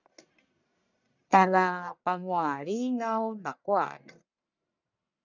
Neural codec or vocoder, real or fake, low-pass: codec, 44.1 kHz, 1.7 kbps, Pupu-Codec; fake; 7.2 kHz